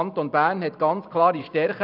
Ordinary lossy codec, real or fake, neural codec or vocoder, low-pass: none; fake; vocoder, 44.1 kHz, 128 mel bands every 256 samples, BigVGAN v2; 5.4 kHz